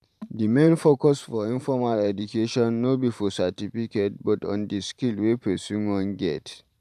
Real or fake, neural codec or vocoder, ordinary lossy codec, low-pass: fake; vocoder, 44.1 kHz, 128 mel bands every 512 samples, BigVGAN v2; none; 14.4 kHz